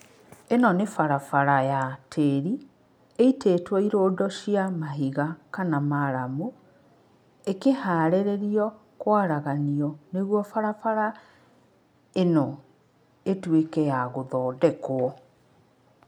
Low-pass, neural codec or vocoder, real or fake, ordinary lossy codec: 19.8 kHz; none; real; none